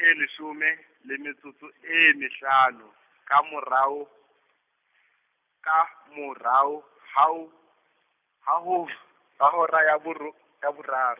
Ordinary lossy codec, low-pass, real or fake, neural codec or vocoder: none; 3.6 kHz; real; none